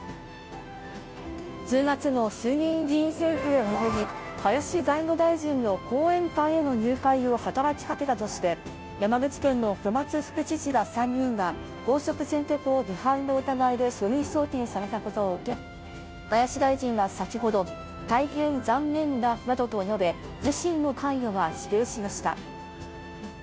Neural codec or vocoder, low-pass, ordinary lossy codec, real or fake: codec, 16 kHz, 0.5 kbps, FunCodec, trained on Chinese and English, 25 frames a second; none; none; fake